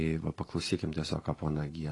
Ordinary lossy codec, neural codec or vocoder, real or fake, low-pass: AAC, 32 kbps; vocoder, 44.1 kHz, 128 mel bands every 512 samples, BigVGAN v2; fake; 10.8 kHz